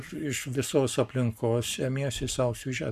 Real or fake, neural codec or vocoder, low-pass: fake; codec, 44.1 kHz, 7.8 kbps, Pupu-Codec; 14.4 kHz